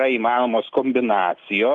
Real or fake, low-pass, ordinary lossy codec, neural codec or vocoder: real; 10.8 kHz; Opus, 24 kbps; none